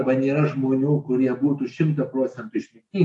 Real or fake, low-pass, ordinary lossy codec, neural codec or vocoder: real; 10.8 kHz; AAC, 64 kbps; none